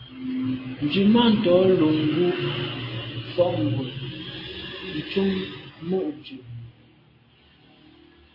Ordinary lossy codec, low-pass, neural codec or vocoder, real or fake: MP3, 24 kbps; 5.4 kHz; none; real